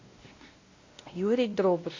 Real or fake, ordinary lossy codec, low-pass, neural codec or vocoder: fake; AAC, 32 kbps; 7.2 kHz; codec, 16 kHz, 1 kbps, X-Codec, WavLM features, trained on Multilingual LibriSpeech